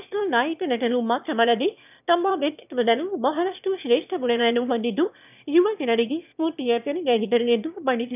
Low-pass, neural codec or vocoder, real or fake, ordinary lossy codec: 3.6 kHz; autoencoder, 22.05 kHz, a latent of 192 numbers a frame, VITS, trained on one speaker; fake; none